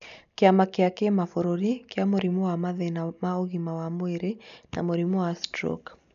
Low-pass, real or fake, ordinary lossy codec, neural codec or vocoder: 7.2 kHz; real; MP3, 96 kbps; none